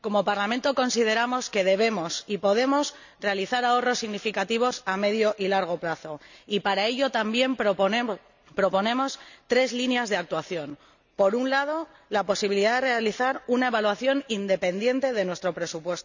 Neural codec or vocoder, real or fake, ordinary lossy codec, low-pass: none; real; none; 7.2 kHz